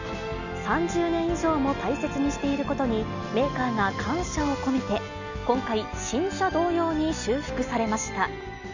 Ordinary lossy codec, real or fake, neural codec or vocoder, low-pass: none; real; none; 7.2 kHz